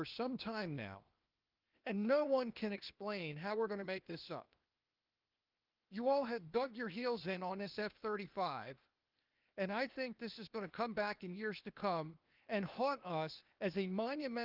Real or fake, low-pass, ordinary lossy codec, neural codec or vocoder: fake; 5.4 kHz; Opus, 24 kbps; codec, 16 kHz, 0.8 kbps, ZipCodec